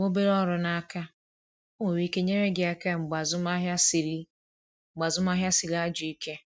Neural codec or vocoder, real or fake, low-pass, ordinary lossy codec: none; real; none; none